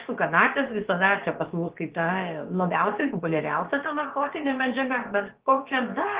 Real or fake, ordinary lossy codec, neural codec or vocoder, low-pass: fake; Opus, 16 kbps; codec, 16 kHz, about 1 kbps, DyCAST, with the encoder's durations; 3.6 kHz